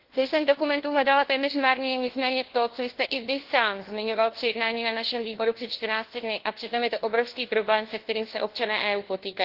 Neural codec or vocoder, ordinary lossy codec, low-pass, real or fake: codec, 16 kHz, 1 kbps, FunCodec, trained on LibriTTS, 50 frames a second; Opus, 16 kbps; 5.4 kHz; fake